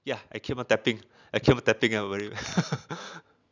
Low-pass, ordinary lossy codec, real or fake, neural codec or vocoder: 7.2 kHz; none; real; none